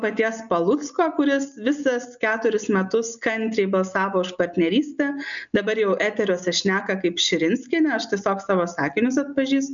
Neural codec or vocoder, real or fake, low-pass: none; real; 7.2 kHz